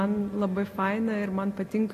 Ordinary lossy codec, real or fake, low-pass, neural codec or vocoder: AAC, 48 kbps; real; 14.4 kHz; none